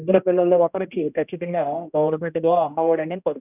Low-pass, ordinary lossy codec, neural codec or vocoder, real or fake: 3.6 kHz; none; codec, 16 kHz, 1 kbps, X-Codec, HuBERT features, trained on general audio; fake